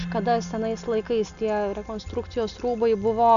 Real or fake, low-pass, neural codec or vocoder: real; 7.2 kHz; none